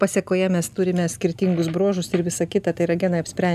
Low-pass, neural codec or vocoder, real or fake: 14.4 kHz; none; real